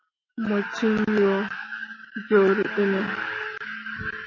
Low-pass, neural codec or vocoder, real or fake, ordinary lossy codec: 7.2 kHz; autoencoder, 48 kHz, 128 numbers a frame, DAC-VAE, trained on Japanese speech; fake; MP3, 32 kbps